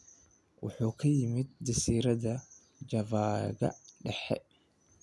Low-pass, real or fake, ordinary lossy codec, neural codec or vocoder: none; real; none; none